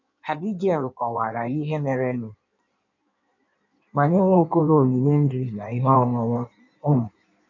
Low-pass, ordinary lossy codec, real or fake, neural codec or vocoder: 7.2 kHz; none; fake; codec, 16 kHz in and 24 kHz out, 1.1 kbps, FireRedTTS-2 codec